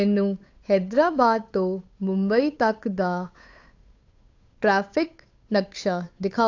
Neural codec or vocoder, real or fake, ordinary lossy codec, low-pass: codec, 16 kHz, 8 kbps, FunCodec, trained on Chinese and English, 25 frames a second; fake; AAC, 48 kbps; 7.2 kHz